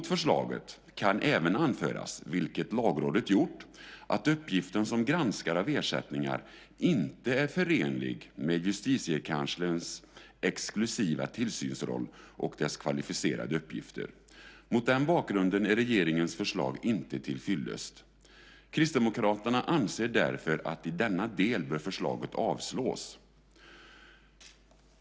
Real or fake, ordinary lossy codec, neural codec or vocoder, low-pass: real; none; none; none